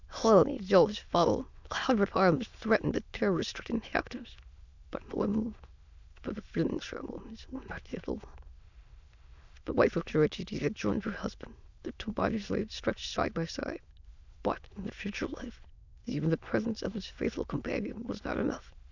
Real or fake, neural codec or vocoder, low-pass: fake; autoencoder, 22.05 kHz, a latent of 192 numbers a frame, VITS, trained on many speakers; 7.2 kHz